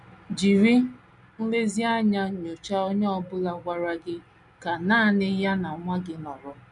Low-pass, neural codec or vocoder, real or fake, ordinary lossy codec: 10.8 kHz; none; real; none